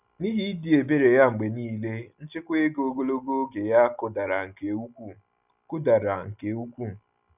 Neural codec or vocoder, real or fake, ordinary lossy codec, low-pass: none; real; none; 3.6 kHz